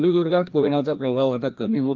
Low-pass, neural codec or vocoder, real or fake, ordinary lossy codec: 7.2 kHz; codec, 16 kHz, 1 kbps, FreqCodec, larger model; fake; Opus, 24 kbps